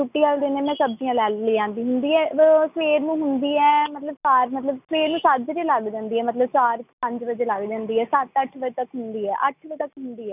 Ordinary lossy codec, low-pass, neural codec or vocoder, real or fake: none; 3.6 kHz; none; real